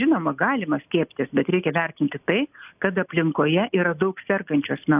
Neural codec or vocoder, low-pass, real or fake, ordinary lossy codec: none; 3.6 kHz; real; AAC, 32 kbps